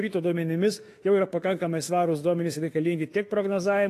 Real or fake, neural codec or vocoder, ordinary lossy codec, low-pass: fake; autoencoder, 48 kHz, 32 numbers a frame, DAC-VAE, trained on Japanese speech; AAC, 48 kbps; 14.4 kHz